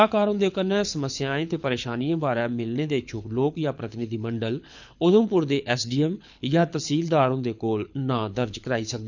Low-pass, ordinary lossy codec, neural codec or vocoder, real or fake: 7.2 kHz; none; codec, 24 kHz, 6 kbps, HILCodec; fake